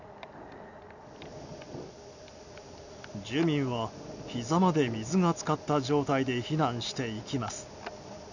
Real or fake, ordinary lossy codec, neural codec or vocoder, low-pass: real; none; none; 7.2 kHz